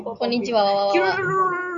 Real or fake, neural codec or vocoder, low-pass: real; none; 7.2 kHz